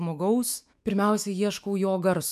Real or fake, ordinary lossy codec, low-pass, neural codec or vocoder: fake; MP3, 96 kbps; 14.4 kHz; autoencoder, 48 kHz, 128 numbers a frame, DAC-VAE, trained on Japanese speech